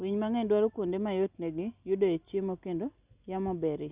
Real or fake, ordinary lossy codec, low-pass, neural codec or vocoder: real; Opus, 64 kbps; 3.6 kHz; none